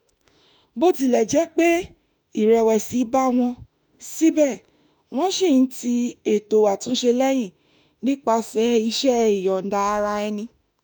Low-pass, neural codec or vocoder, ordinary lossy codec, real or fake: none; autoencoder, 48 kHz, 32 numbers a frame, DAC-VAE, trained on Japanese speech; none; fake